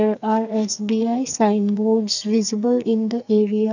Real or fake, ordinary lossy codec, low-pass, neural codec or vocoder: fake; none; 7.2 kHz; codec, 44.1 kHz, 2.6 kbps, SNAC